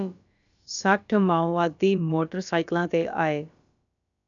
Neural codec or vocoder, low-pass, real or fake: codec, 16 kHz, about 1 kbps, DyCAST, with the encoder's durations; 7.2 kHz; fake